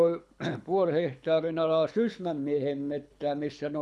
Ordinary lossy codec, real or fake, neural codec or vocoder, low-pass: none; fake; codec, 24 kHz, 6 kbps, HILCodec; none